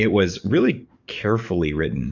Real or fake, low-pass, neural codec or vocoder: fake; 7.2 kHz; codec, 44.1 kHz, 7.8 kbps, DAC